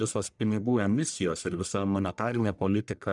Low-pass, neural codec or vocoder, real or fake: 10.8 kHz; codec, 44.1 kHz, 1.7 kbps, Pupu-Codec; fake